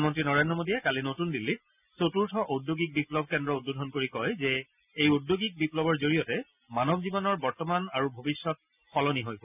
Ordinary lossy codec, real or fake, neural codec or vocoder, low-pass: none; real; none; 3.6 kHz